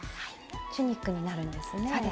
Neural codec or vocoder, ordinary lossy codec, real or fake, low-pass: none; none; real; none